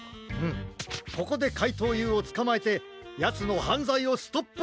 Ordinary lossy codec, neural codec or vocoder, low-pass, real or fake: none; none; none; real